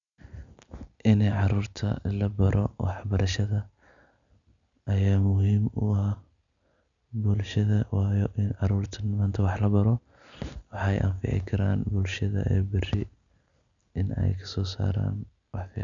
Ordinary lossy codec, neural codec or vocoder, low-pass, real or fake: none; none; 7.2 kHz; real